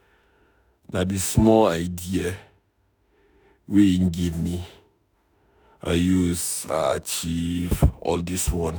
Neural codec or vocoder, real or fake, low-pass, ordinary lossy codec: autoencoder, 48 kHz, 32 numbers a frame, DAC-VAE, trained on Japanese speech; fake; none; none